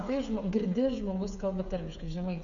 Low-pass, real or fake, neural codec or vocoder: 7.2 kHz; fake; codec, 16 kHz, 8 kbps, FreqCodec, smaller model